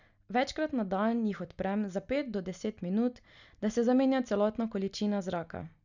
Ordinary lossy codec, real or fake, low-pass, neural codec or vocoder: none; real; 7.2 kHz; none